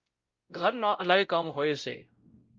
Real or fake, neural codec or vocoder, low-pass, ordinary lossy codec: fake; codec, 16 kHz, 0.5 kbps, X-Codec, WavLM features, trained on Multilingual LibriSpeech; 7.2 kHz; Opus, 32 kbps